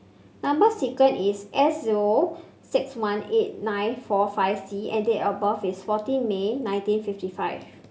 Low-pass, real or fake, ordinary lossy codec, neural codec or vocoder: none; real; none; none